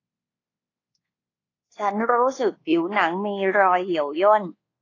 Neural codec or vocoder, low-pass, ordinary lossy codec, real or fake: codec, 24 kHz, 1.2 kbps, DualCodec; 7.2 kHz; AAC, 32 kbps; fake